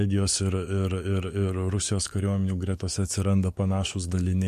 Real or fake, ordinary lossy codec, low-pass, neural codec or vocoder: fake; MP3, 64 kbps; 14.4 kHz; codec, 44.1 kHz, 7.8 kbps, DAC